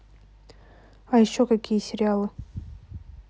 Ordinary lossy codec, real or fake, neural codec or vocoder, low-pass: none; real; none; none